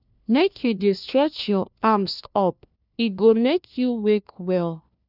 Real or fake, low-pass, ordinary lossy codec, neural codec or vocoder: fake; 5.4 kHz; none; codec, 16 kHz, 1 kbps, FunCodec, trained on LibriTTS, 50 frames a second